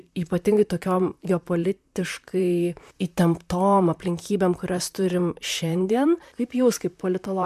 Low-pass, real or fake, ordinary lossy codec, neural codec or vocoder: 14.4 kHz; fake; MP3, 96 kbps; vocoder, 44.1 kHz, 128 mel bands, Pupu-Vocoder